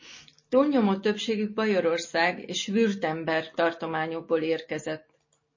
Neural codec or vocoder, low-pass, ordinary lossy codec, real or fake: none; 7.2 kHz; MP3, 32 kbps; real